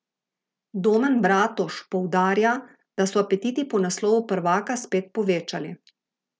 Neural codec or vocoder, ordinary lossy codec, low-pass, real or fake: none; none; none; real